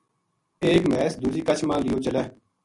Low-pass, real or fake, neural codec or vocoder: 10.8 kHz; real; none